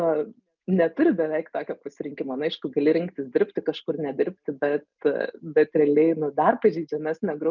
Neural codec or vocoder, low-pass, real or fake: none; 7.2 kHz; real